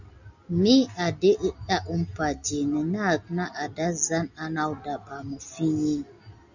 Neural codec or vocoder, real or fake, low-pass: none; real; 7.2 kHz